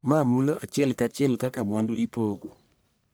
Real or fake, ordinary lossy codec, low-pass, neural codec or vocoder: fake; none; none; codec, 44.1 kHz, 1.7 kbps, Pupu-Codec